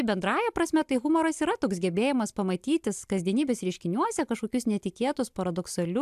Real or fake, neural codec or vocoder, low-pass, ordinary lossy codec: real; none; 14.4 kHz; AAC, 96 kbps